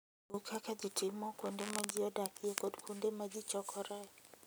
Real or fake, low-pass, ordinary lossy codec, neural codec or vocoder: real; none; none; none